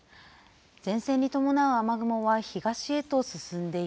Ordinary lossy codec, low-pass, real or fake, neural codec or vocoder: none; none; real; none